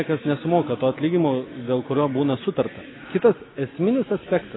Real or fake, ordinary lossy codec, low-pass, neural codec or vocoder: real; AAC, 16 kbps; 7.2 kHz; none